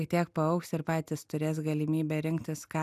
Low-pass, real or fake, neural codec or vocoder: 14.4 kHz; real; none